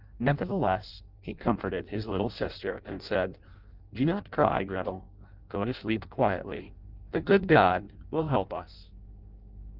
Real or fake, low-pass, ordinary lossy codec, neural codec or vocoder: fake; 5.4 kHz; Opus, 32 kbps; codec, 16 kHz in and 24 kHz out, 0.6 kbps, FireRedTTS-2 codec